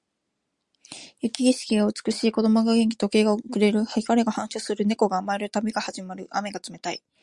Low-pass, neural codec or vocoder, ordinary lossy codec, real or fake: 10.8 kHz; none; Opus, 64 kbps; real